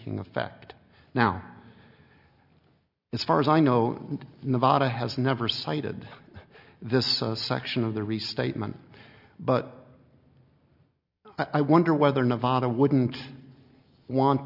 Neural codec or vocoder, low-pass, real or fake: none; 5.4 kHz; real